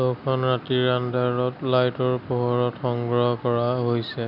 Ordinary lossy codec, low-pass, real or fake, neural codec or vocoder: none; 5.4 kHz; real; none